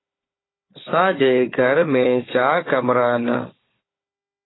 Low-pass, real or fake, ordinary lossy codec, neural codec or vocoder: 7.2 kHz; fake; AAC, 16 kbps; codec, 16 kHz, 4 kbps, FunCodec, trained on Chinese and English, 50 frames a second